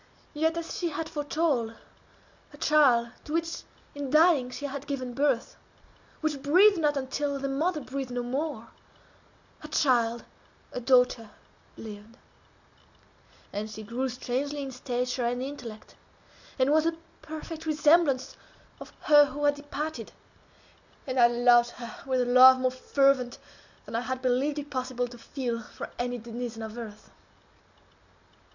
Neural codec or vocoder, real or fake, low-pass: none; real; 7.2 kHz